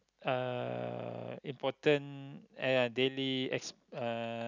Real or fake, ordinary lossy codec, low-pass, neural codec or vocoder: real; none; 7.2 kHz; none